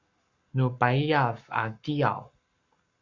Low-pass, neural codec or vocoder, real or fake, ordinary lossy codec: 7.2 kHz; codec, 44.1 kHz, 7.8 kbps, Pupu-Codec; fake; Opus, 64 kbps